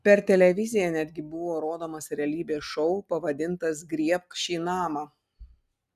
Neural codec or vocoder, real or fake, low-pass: none; real; 14.4 kHz